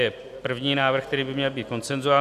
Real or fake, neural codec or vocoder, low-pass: real; none; 14.4 kHz